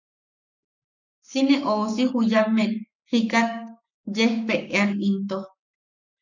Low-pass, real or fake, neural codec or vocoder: 7.2 kHz; fake; codec, 44.1 kHz, 7.8 kbps, Pupu-Codec